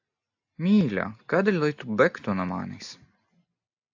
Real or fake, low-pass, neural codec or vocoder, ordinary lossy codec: real; 7.2 kHz; none; AAC, 48 kbps